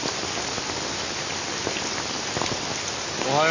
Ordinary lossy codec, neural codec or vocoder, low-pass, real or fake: AAC, 48 kbps; none; 7.2 kHz; real